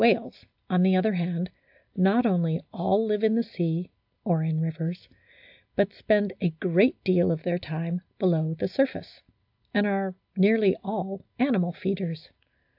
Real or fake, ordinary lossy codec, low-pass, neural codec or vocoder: real; AAC, 48 kbps; 5.4 kHz; none